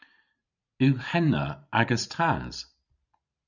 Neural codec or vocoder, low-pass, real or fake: vocoder, 44.1 kHz, 128 mel bands every 512 samples, BigVGAN v2; 7.2 kHz; fake